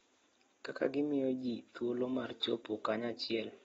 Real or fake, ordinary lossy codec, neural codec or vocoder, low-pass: real; AAC, 24 kbps; none; 19.8 kHz